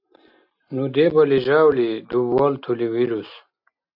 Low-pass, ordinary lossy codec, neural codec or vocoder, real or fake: 5.4 kHz; AAC, 48 kbps; none; real